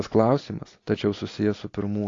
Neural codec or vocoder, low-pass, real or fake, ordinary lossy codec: none; 7.2 kHz; real; AAC, 32 kbps